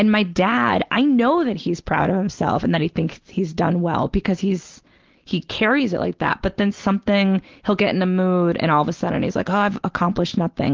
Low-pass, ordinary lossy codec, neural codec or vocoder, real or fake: 7.2 kHz; Opus, 16 kbps; none; real